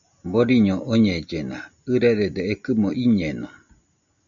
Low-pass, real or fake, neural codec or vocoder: 7.2 kHz; real; none